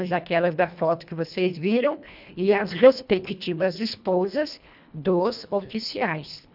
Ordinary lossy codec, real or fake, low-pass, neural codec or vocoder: none; fake; 5.4 kHz; codec, 24 kHz, 1.5 kbps, HILCodec